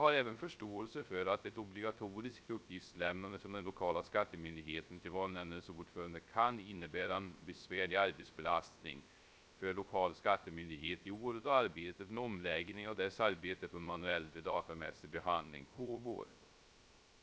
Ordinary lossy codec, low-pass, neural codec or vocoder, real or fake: none; none; codec, 16 kHz, 0.3 kbps, FocalCodec; fake